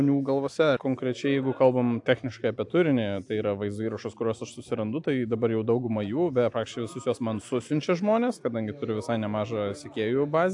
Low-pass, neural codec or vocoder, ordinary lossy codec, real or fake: 10.8 kHz; none; AAC, 64 kbps; real